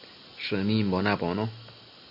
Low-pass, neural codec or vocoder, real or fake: 5.4 kHz; none; real